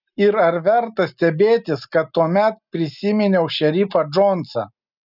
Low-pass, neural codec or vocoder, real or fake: 5.4 kHz; none; real